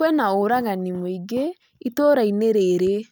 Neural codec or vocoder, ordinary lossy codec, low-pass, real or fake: none; none; none; real